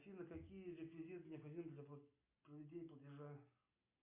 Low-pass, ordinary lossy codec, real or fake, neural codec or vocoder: 3.6 kHz; MP3, 32 kbps; real; none